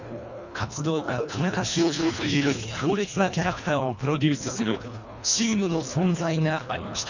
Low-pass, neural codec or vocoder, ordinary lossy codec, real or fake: 7.2 kHz; codec, 24 kHz, 1.5 kbps, HILCodec; none; fake